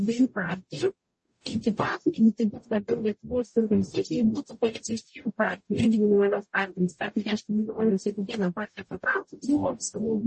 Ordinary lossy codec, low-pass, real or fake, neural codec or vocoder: MP3, 32 kbps; 10.8 kHz; fake; codec, 44.1 kHz, 0.9 kbps, DAC